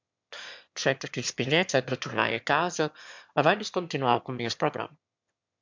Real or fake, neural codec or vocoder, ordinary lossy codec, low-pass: fake; autoencoder, 22.05 kHz, a latent of 192 numbers a frame, VITS, trained on one speaker; MP3, 64 kbps; 7.2 kHz